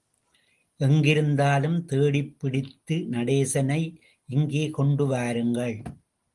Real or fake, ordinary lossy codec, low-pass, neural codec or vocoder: real; Opus, 24 kbps; 10.8 kHz; none